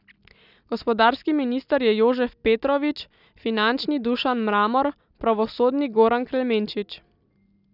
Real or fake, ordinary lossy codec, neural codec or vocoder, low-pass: real; none; none; 5.4 kHz